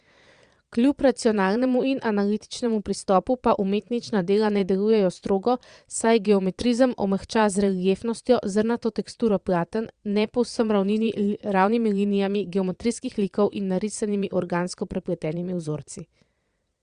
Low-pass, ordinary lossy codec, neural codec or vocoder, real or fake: 9.9 kHz; Opus, 64 kbps; vocoder, 22.05 kHz, 80 mel bands, Vocos; fake